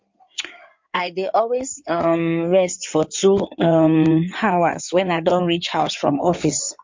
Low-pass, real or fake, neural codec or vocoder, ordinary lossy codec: 7.2 kHz; fake; codec, 16 kHz in and 24 kHz out, 2.2 kbps, FireRedTTS-2 codec; MP3, 48 kbps